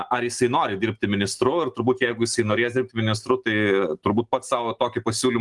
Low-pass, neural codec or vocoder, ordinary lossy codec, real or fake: 10.8 kHz; none; Opus, 24 kbps; real